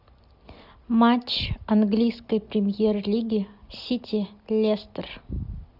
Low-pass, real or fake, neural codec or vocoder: 5.4 kHz; real; none